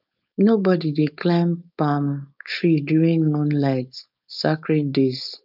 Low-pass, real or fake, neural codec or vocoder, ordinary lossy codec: 5.4 kHz; fake; codec, 16 kHz, 4.8 kbps, FACodec; none